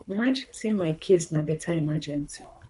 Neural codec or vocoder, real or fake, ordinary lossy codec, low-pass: codec, 24 kHz, 3 kbps, HILCodec; fake; none; 10.8 kHz